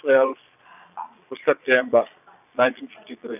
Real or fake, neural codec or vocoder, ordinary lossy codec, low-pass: fake; vocoder, 22.05 kHz, 80 mel bands, Vocos; none; 3.6 kHz